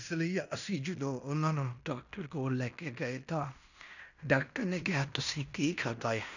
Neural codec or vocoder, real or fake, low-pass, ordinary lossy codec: codec, 16 kHz in and 24 kHz out, 0.9 kbps, LongCat-Audio-Codec, fine tuned four codebook decoder; fake; 7.2 kHz; none